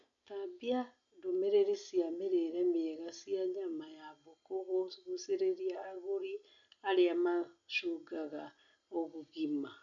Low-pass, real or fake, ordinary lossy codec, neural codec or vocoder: 7.2 kHz; real; none; none